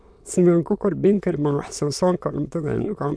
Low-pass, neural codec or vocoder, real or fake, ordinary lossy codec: none; autoencoder, 22.05 kHz, a latent of 192 numbers a frame, VITS, trained on many speakers; fake; none